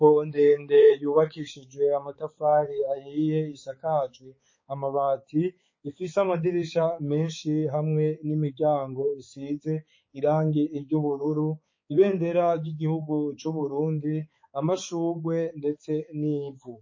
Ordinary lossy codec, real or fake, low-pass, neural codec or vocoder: MP3, 32 kbps; fake; 7.2 kHz; codec, 16 kHz, 4 kbps, X-Codec, HuBERT features, trained on balanced general audio